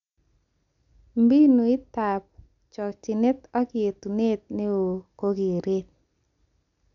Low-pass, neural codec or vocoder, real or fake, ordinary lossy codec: 7.2 kHz; none; real; none